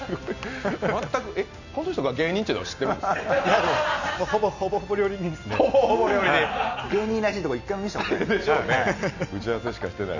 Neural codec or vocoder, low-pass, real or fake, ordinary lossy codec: none; 7.2 kHz; real; none